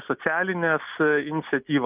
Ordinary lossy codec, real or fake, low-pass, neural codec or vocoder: Opus, 24 kbps; real; 3.6 kHz; none